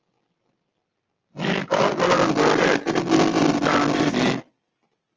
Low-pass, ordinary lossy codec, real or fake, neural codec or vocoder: 7.2 kHz; Opus, 24 kbps; real; none